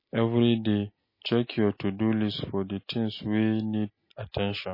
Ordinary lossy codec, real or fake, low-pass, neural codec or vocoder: MP3, 24 kbps; real; 5.4 kHz; none